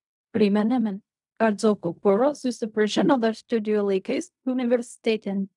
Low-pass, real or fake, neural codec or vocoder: 10.8 kHz; fake; codec, 16 kHz in and 24 kHz out, 0.4 kbps, LongCat-Audio-Codec, fine tuned four codebook decoder